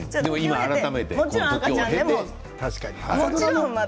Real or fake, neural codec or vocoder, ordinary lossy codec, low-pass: real; none; none; none